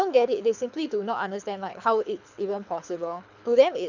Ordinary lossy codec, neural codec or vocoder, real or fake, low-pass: none; codec, 24 kHz, 6 kbps, HILCodec; fake; 7.2 kHz